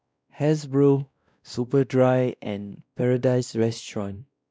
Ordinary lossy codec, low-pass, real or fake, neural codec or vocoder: none; none; fake; codec, 16 kHz, 1 kbps, X-Codec, WavLM features, trained on Multilingual LibriSpeech